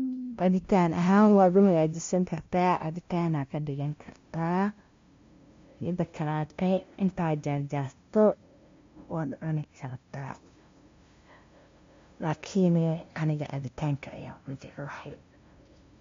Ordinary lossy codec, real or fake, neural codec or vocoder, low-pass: MP3, 48 kbps; fake; codec, 16 kHz, 0.5 kbps, FunCodec, trained on LibriTTS, 25 frames a second; 7.2 kHz